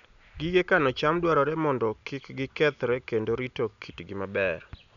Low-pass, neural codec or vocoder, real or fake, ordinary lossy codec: 7.2 kHz; none; real; none